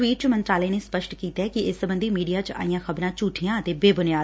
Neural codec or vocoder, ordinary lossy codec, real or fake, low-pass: none; none; real; none